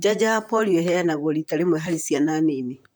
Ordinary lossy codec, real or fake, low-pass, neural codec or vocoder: none; fake; none; vocoder, 44.1 kHz, 128 mel bands, Pupu-Vocoder